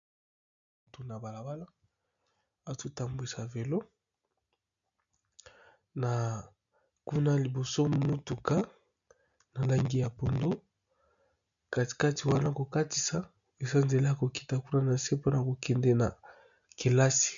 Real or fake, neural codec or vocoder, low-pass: real; none; 7.2 kHz